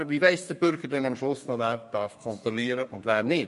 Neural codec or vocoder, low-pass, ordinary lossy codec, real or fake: codec, 32 kHz, 1.9 kbps, SNAC; 14.4 kHz; MP3, 48 kbps; fake